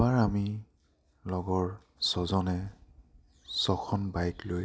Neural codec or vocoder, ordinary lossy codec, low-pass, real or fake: none; none; none; real